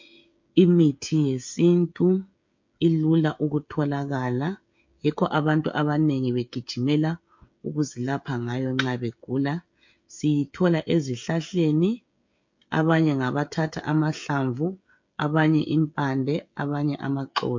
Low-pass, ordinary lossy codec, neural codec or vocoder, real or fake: 7.2 kHz; MP3, 48 kbps; codec, 16 kHz, 16 kbps, FreqCodec, smaller model; fake